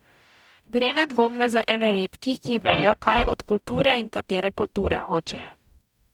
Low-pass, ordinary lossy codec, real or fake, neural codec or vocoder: 19.8 kHz; none; fake; codec, 44.1 kHz, 0.9 kbps, DAC